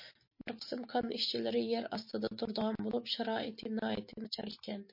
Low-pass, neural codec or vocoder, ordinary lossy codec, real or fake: 5.4 kHz; none; MP3, 32 kbps; real